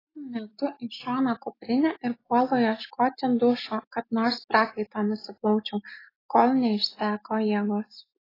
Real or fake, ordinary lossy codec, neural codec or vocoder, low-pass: real; AAC, 24 kbps; none; 5.4 kHz